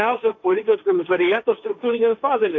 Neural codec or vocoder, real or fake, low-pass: codec, 16 kHz, 1.1 kbps, Voila-Tokenizer; fake; 7.2 kHz